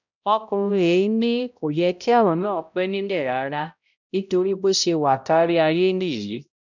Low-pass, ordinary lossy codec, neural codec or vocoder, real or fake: 7.2 kHz; none; codec, 16 kHz, 0.5 kbps, X-Codec, HuBERT features, trained on balanced general audio; fake